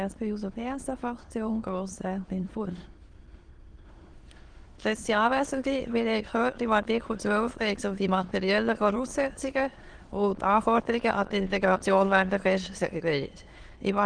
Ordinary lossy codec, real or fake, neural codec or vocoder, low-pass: Opus, 16 kbps; fake; autoencoder, 22.05 kHz, a latent of 192 numbers a frame, VITS, trained on many speakers; 9.9 kHz